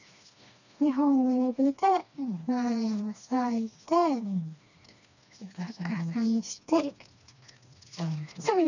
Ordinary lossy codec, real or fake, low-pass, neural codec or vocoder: none; fake; 7.2 kHz; codec, 16 kHz, 2 kbps, FreqCodec, smaller model